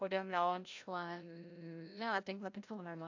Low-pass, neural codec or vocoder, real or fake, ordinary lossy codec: 7.2 kHz; codec, 16 kHz, 0.5 kbps, FreqCodec, larger model; fake; AAC, 48 kbps